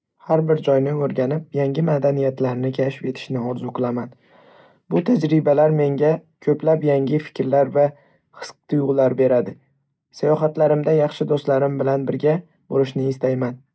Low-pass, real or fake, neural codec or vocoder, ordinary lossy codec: none; real; none; none